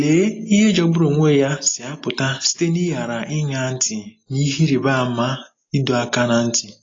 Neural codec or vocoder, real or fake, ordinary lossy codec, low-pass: none; real; AAC, 32 kbps; 7.2 kHz